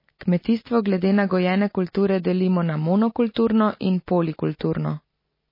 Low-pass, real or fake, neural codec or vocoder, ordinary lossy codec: 5.4 kHz; real; none; MP3, 24 kbps